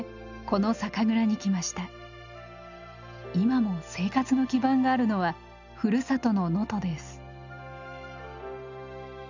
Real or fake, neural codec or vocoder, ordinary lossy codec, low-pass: real; none; none; 7.2 kHz